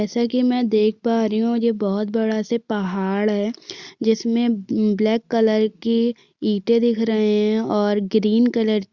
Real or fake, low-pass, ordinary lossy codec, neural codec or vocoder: real; 7.2 kHz; Opus, 64 kbps; none